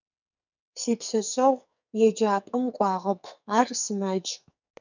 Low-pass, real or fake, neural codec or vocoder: 7.2 kHz; fake; codec, 44.1 kHz, 2.6 kbps, SNAC